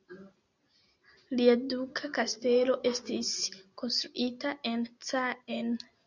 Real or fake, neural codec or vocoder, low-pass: real; none; 7.2 kHz